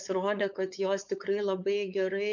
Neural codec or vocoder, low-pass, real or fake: codec, 16 kHz, 4.8 kbps, FACodec; 7.2 kHz; fake